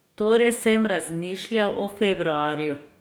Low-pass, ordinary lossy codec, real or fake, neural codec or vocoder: none; none; fake; codec, 44.1 kHz, 2.6 kbps, DAC